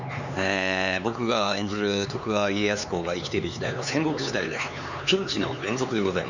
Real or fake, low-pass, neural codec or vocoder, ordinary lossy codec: fake; 7.2 kHz; codec, 16 kHz, 4 kbps, X-Codec, HuBERT features, trained on LibriSpeech; none